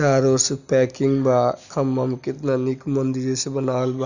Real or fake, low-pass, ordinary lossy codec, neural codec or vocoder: real; 7.2 kHz; none; none